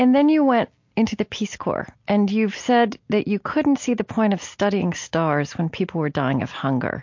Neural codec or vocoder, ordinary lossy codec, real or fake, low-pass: none; MP3, 48 kbps; real; 7.2 kHz